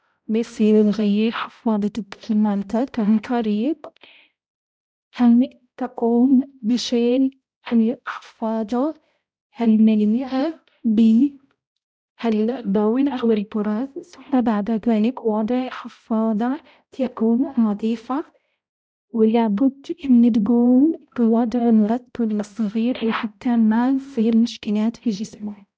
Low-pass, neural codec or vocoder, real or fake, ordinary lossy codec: none; codec, 16 kHz, 0.5 kbps, X-Codec, HuBERT features, trained on balanced general audio; fake; none